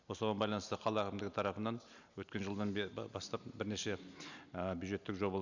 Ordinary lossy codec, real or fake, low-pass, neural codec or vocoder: none; real; 7.2 kHz; none